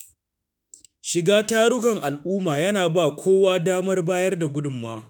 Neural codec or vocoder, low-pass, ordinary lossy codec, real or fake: autoencoder, 48 kHz, 32 numbers a frame, DAC-VAE, trained on Japanese speech; none; none; fake